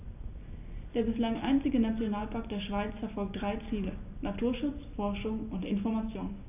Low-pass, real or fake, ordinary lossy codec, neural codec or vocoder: 3.6 kHz; real; none; none